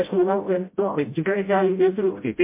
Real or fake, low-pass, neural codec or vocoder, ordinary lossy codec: fake; 3.6 kHz; codec, 16 kHz, 0.5 kbps, FreqCodec, smaller model; MP3, 24 kbps